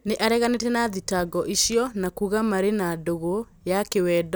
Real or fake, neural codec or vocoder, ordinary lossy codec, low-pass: real; none; none; none